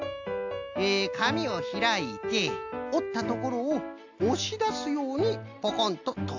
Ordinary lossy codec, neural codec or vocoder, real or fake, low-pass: AAC, 48 kbps; none; real; 7.2 kHz